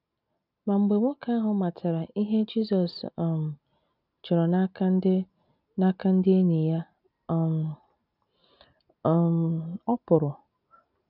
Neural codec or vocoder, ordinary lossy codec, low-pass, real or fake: none; none; 5.4 kHz; real